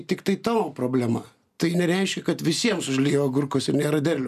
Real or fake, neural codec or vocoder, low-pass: real; none; 14.4 kHz